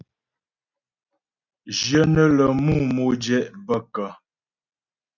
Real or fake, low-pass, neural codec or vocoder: real; 7.2 kHz; none